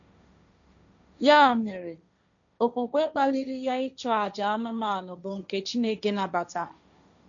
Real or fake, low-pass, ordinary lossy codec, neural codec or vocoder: fake; 7.2 kHz; none; codec, 16 kHz, 1.1 kbps, Voila-Tokenizer